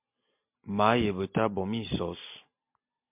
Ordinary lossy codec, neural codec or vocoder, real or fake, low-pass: MP3, 32 kbps; none; real; 3.6 kHz